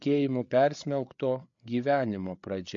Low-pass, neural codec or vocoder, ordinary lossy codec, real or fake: 7.2 kHz; codec, 16 kHz, 8 kbps, FreqCodec, larger model; MP3, 48 kbps; fake